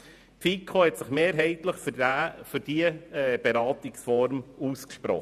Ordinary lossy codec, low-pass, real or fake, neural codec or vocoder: none; 14.4 kHz; fake; vocoder, 48 kHz, 128 mel bands, Vocos